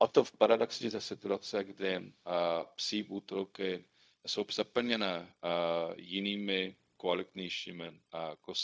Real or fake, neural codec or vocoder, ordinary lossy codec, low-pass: fake; codec, 16 kHz, 0.4 kbps, LongCat-Audio-Codec; none; none